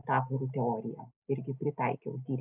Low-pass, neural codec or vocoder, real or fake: 3.6 kHz; none; real